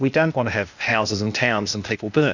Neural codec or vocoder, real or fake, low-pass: codec, 16 kHz, 0.8 kbps, ZipCodec; fake; 7.2 kHz